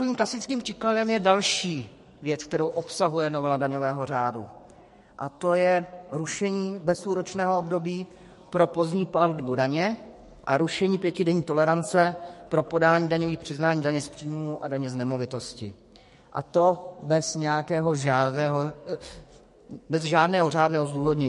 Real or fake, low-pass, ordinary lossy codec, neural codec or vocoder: fake; 14.4 kHz; MP3, 48 kbps; codec, 44.1 kHz, 2.6 kbps, SNAC